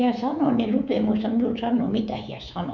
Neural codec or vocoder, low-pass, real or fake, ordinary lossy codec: codec, 24 kHz, 3.1 kbps, DualCodec; 7.2 kHz; fake; none